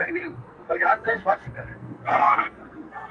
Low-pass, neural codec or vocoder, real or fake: 9.9 kHz; codec, 44.1 kHz, 2.6 kbps, SNAC; fake